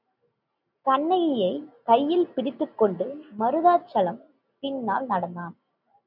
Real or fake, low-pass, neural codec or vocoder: real; 5.4 kHz; none